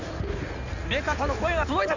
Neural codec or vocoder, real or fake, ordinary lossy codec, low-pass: codec, 16 kHz in and 24 kHz out, 2.2 kbps, FireRedTTS-2 codec; fake; none; 7.2 kHz